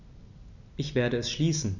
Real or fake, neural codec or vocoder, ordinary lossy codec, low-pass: real; none; none; 7.2 kHz